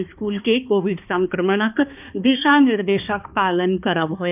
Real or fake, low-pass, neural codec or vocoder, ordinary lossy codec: fake; 3.6 kHz; codec, 16 kHz, 2 kbps, X-Codec, HuBERT features, trained on balanced general audio; none